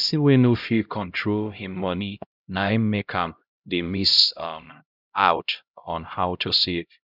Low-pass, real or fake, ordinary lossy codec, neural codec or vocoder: 5.4 kHz; fake; none; codec, 16 kHz, 0.5 kbps, X-Codec, HuBERT features, trained on LibriSpeech